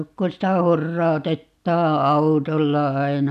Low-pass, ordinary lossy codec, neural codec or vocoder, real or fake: 14.4 kHz; none; none; real